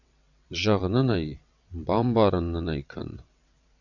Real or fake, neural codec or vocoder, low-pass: fake; vocoder, 22.05 kHz, 80 mel bands, WaveNeXt; 7.2 kHz